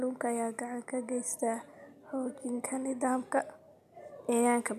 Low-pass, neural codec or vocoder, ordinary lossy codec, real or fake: 14.4 kHz; none; none; real